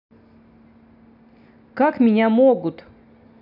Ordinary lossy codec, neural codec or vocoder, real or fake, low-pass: none; none; real; 5.4 kHz